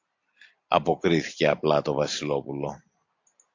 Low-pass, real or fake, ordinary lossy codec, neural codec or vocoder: 7.2 kHz; real; AAC, 48 kbps; none